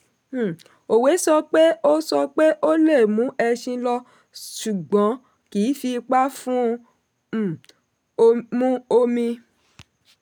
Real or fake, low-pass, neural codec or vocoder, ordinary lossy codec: real; 19.8 kHz; none; none